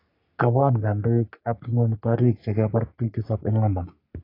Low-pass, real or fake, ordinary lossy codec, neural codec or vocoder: 5.4 kHz; fake; AAC, 32 kbps; codec, 44.1 kHz, 3.4 kbps, Pupu-Codec